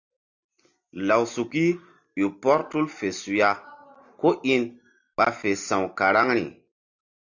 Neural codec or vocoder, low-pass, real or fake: none; 7.2 kHz; real